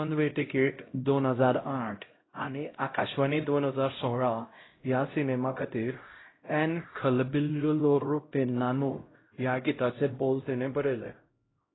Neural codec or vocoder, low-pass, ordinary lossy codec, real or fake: codec, 16 kHz, 0.5 kbps, X-Codec, HuBERT features, trained on LibriSpeech; 7.2 kHz; AAC, 16 kbps; fake